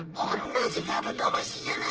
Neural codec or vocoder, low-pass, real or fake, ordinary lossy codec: codec, 24 kHz, 1 kbps, SNAC; 7.2 kHz; fake; Opus, 16 kbps